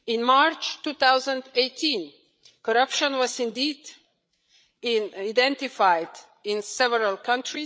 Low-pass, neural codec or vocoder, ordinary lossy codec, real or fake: none; codec, 16 kHz, 8 kbps, FreqCodec, larger model; none; fake